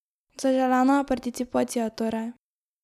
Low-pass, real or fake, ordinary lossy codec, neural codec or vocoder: 14.4 kHz; real; none; none